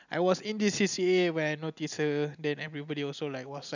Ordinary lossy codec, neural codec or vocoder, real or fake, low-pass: none; none; real; 7.2 kHz